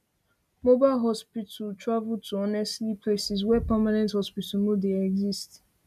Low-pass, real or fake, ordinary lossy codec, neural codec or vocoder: 14.4 kHz; real; none; none